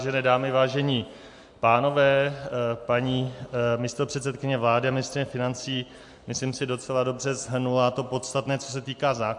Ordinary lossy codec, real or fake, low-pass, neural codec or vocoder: MP3, 64 kbps; real; 10.8 kHz; none